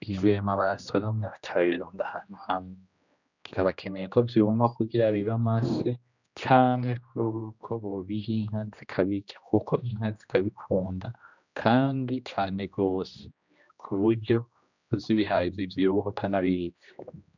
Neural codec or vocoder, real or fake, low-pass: codec, 16 kHz, 1 kbps, X-Codec, HuBERT features, trained on general audio; fake; 7.2 kHz